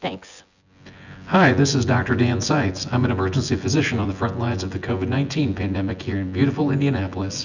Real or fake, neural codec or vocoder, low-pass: fake; vocoder, 24 kHz, 100 mel bands, Vocos; 7.2 kHz